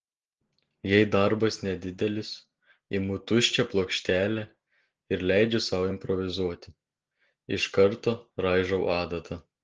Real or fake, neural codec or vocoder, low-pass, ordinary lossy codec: real; none; 7.2 kHz; Opus, 16 kbps